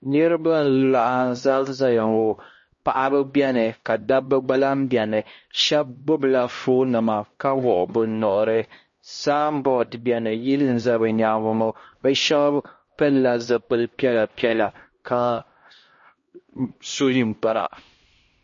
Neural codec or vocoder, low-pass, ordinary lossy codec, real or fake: codec, 16 kHz, 1 kbps, X-Codec, HuBERT features, trained on LibriSpeech; 7.2 kHz; MP3, 32 kbps; fake